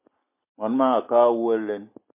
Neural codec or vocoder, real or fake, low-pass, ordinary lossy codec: none; real; 3.6 kHz; MP3, 24 kbps